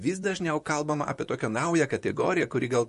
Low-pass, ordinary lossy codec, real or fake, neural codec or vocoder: 14.4 kHz; MP3, 48 kbps; fake; vocoder, 44.1 kHz, 128 mel bands, Pupu-Vocoder